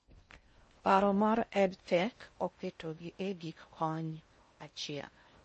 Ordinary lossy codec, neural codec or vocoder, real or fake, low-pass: MP3, 32 kbps; codec, 16 kHz in and 24 kHz out, 0.6 kbps, FocalCodec, streaming, 2048 codes; fake; 10.8 kHz